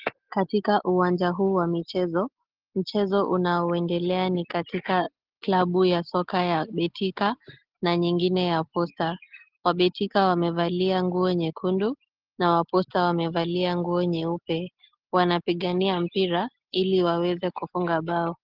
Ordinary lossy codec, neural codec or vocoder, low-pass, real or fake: Opus, 16 kbps; none; 5.4 kHz; real